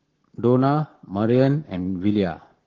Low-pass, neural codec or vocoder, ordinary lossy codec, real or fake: 7.2 kHz; codec, 44.1 kHz, 7.8 kbps, Pupu-Codec; Opus, 16 kbps; fake